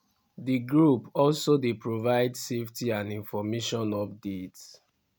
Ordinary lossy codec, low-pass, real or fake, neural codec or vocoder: none; none; real; none